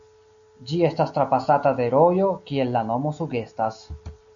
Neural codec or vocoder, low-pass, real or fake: none; 7.2 kHz; real